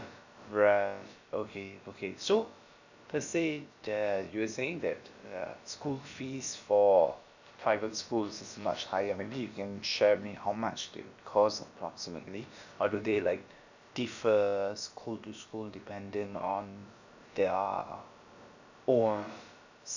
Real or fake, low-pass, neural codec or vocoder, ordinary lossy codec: fake; 7.2 kHz; codec, 16 kHz, about 1 kbps, DyCAST, with the encoder's durations; none